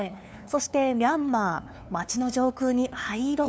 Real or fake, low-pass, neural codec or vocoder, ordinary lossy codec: fake; none; codec, 16 kHz, 2 kbps, FunCodec, trained on LibriTTS, 25 frames a second; none